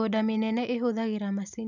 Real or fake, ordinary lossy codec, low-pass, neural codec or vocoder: real; none; 7.2 kHz; none